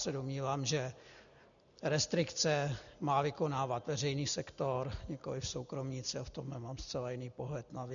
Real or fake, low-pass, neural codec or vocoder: real; 7.2 kHz; none